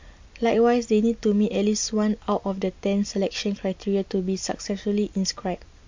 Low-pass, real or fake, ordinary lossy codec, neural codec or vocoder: 7.2 kHz; real; MP3, 48 kbps; none